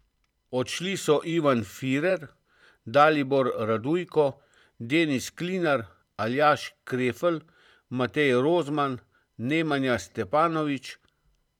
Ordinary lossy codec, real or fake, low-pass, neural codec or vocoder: none; fake; 19.8 kHz; vocoder, 44.1 kHz, 128 mel bands every 512 samples, BigVGAN v2